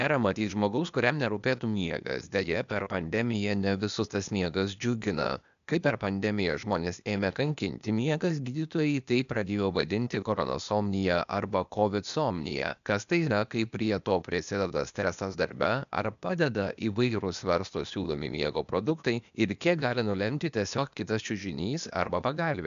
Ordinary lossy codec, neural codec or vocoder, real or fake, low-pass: MP3, 96 kbps; codec, 16 kHz, 0.8 kbps, ZipCodec; fake; 7.2 kHz